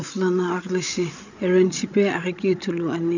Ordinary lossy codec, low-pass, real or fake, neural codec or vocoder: none; 7.2 kHz; fake; codec, 16 kHz, 16 kbps, FreqCodec, larger model